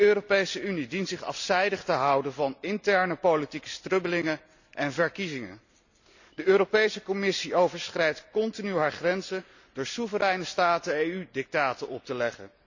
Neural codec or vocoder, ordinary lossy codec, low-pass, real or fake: none; none; 7.2 kHz; real